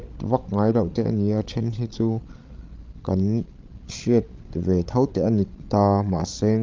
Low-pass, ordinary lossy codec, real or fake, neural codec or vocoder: 7.2 kHz; Opus, 24 kbps; fake; codec, 16 kHz, 16 kbps, FreqCodec, larger model